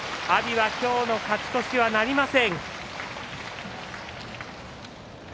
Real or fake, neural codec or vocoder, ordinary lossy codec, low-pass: real; none; none; none